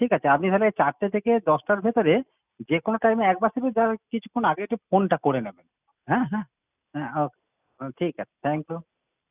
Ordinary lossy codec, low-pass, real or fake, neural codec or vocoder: none; 3.6 kHz; real; none